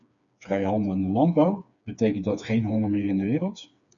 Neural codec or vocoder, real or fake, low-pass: codec, 16 kHz, 4 kbps, FreqCodec, smaller model; fake; 7.2 kHz